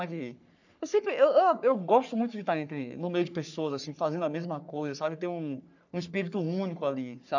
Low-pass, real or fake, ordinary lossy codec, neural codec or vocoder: 7.2 kHz; fake; none; codec, 44.1 kHz, 3.4 kbps, Pupu-Codec